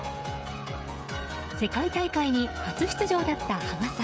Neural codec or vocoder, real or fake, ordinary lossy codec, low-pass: codec, 16 kHz, 16 kbps, FreqCodec, smaller model; fake; none; none